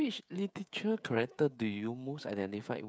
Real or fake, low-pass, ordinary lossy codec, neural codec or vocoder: fake; none; none; codec, 16 kHz, 16 kbps, FreqCodec, smaller model